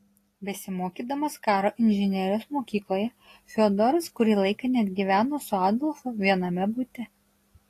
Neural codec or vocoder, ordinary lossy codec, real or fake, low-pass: none; AAC, 48 kbps; real; 14.4 kHz